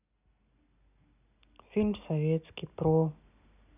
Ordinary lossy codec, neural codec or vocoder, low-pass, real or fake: none; none; 3.6 kHz; real